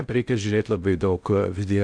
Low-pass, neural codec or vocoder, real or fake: 9.9 kHz; codec, 16 kHz in and 24 kHz out, 0.6 kbps, FocalCodec, streaming, 2048 codes; fake